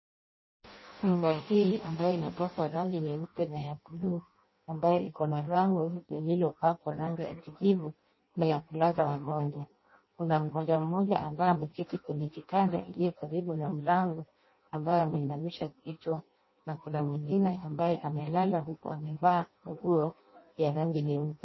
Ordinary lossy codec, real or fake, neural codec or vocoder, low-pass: MP3, 24 kbps; fake; codec, 16 kHz in and 24 kHz out, 0.6 kbps, FireRedTTS-2 codec; 7.2 kHz